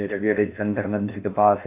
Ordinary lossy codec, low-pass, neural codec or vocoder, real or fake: AAC, 32 kbps; 3.6 kHz; codec, 16 kHz in and 24 kHz out, 0.6 kbps, FocalCodec, streaming, 4096 codes; fake